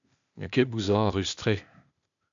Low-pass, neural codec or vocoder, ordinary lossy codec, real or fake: 7.2 kHz; codec, 16 kHz, 0.8 kbps, ZipCodec; MP3, 96 kbps; fake